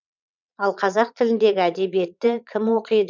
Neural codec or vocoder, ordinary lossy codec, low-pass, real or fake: vocoder, 22.05 kHz, 80 mel bands, Vocos; none; 7.2 kHz; fake